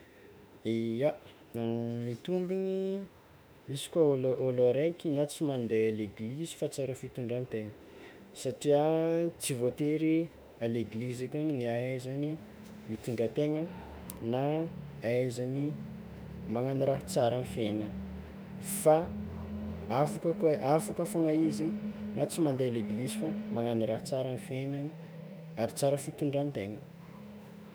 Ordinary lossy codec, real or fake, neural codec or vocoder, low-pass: none; fake; autoencoder, 48 kHz, 32 numbers a frame, DAC-VAE, trained on Japanese speech; none